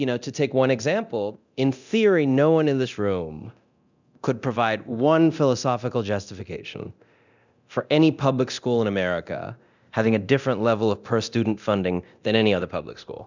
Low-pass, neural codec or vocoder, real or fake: 7.2 kHz; codec, 24 kHz, 0.9 kbps, DualCodec; fake